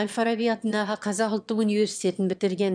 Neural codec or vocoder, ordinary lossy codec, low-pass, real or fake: autoencoder, 22.05 kHz, a latent of 192 numbers a frame, VITS, trained on one speaker; none; 9.9 kHz; fake